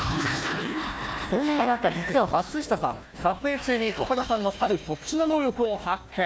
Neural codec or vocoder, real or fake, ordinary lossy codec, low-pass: codec, 16 kHz, 1 kbps, FunCodec, trained on Chinese and English, 50 frames a second; fake; none; none